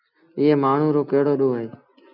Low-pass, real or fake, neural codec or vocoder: 5.4 kHz; real; none